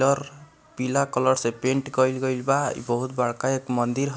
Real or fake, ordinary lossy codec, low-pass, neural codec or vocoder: real; none; none; none